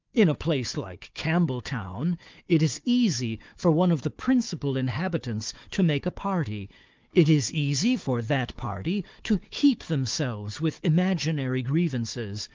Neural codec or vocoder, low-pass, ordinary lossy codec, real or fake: codec, 16 kHz, 4 kbps, FunCodec, trained on Chinese and English, 50 frames a second; 7.2 kHz; Opus, 32 kbps; fake